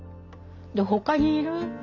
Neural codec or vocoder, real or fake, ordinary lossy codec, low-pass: none; real; none; 7.2 kHz